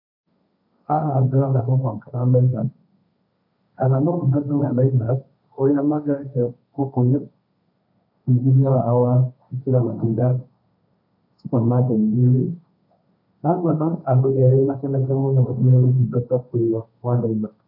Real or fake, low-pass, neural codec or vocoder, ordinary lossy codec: fake; 5.4 kHz; codec, 16 kHz, 1.1 kbps, Voila-Tokenizer; AAC, 48 kbps